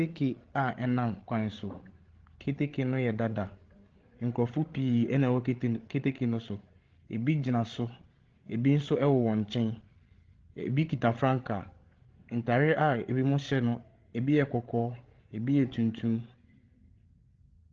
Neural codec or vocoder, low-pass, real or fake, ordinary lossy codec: codec, 16 kHz, 16 kbps, FreqCodec, larger model; 7.2 kHz; fake; Opus, 16 kbps